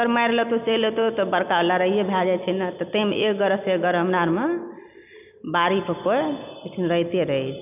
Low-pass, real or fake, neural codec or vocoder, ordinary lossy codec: 3.6 kHz; real; none; none